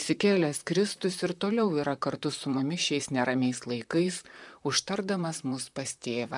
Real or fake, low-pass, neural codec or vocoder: fake; 10.8 kHz; vocoder, 44.1 kHz, 128 mel bands, Pupu-Vocoder